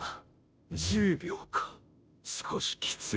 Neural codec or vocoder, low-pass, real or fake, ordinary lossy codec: codec, 16 kHz, 0.5 kbps, FunCodec, trained on Chinese and English, 25 frames a second; none; fake; none